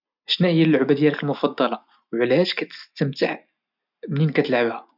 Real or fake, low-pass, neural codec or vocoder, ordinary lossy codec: real; 5.4 kHz; none; none